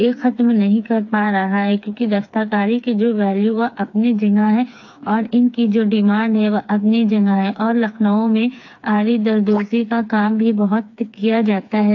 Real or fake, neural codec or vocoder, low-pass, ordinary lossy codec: fake; codec, 16 kHz, 4 kbps, FreqCodec, smaller model; 7.2 kHz; AAC, 48 kbps